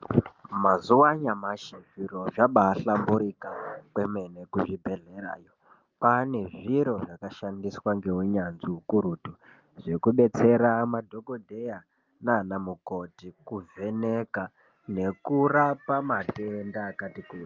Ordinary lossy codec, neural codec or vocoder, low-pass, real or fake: Opus, 24 kbps; none; 7.2 kHz; real